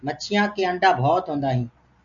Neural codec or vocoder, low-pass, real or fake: none; 7.2 kHz; real